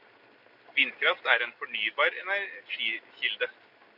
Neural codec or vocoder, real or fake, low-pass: none; real; 5.4 kHz